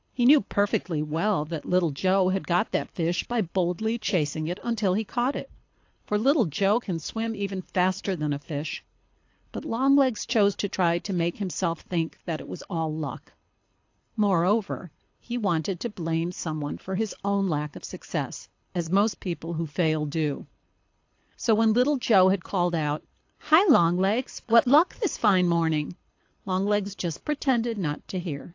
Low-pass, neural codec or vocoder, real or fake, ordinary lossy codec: 7.2 kHz; codec, 24 kHz, 6 kbps, HILCodec; fake; AAC, 48 kbps